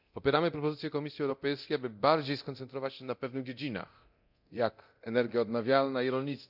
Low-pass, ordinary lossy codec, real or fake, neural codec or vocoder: 5.4 kHz; none; fake; codec, 24 kHz, 0.9 kbps, DualCodec